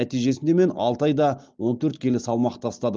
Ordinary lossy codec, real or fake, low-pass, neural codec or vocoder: Opus, 32 kbps; real; 7.2 kHz; none